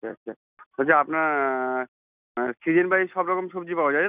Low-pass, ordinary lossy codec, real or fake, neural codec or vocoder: 3.6 kHz; none; real; none